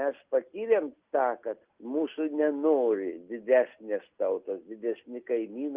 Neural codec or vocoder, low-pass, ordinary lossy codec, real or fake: none; 3.6 kHz; Opus, 32 kbps; real